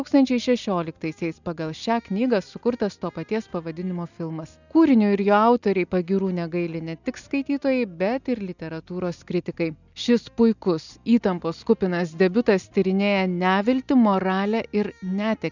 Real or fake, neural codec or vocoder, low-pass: real; none; 7.2 kHz